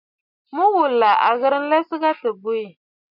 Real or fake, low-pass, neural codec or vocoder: real; 5.4 kHz; none